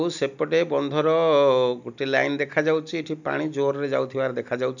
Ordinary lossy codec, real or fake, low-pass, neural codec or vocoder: none; real; 7.2 kHz; none